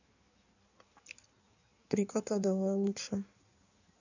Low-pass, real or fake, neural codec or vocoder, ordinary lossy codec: 7.2 kHz; fake; codec, 16 kHz in and 24 kHz out, 1.1 kbps, FireRedTTS-2 codec; none